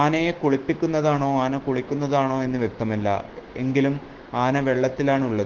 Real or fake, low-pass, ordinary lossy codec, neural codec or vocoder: fake; 7.2 kHz; Opus, 16 kbps; codec, 16 kHz, 6 kbps, DAC